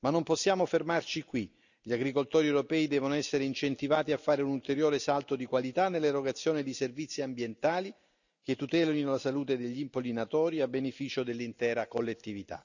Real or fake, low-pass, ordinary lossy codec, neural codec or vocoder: real; 7.2 kHz; none; none